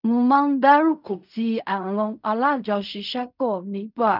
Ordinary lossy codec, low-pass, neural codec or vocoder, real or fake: none; 5.4 kHz; codec, 16 kHz in and 24 kHz out, 0.4 kbps, LongCat-Audio-Codec, fine tuned four codebook decoder; fake